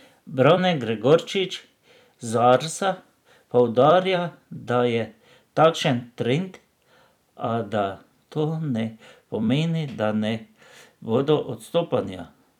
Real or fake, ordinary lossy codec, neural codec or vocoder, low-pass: fake; none; vocoder, 44.1 kHz, 128 mel bands every 256 samples, BigVGAN v2; 19.8 kHz